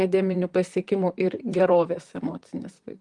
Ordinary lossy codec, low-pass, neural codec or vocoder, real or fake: Opus, 24 kbps; 10.8 kHz; vocoder, 44.1 kHz, 128 mel bands, Pupu-Vocoder; fake